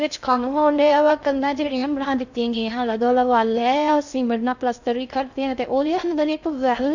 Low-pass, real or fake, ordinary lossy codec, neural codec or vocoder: 7.2 kHz; fake; none; codec, 16 kHz in and 24 kHz out, 0.6 kbps, FocalCodec, streaming, 4096 codes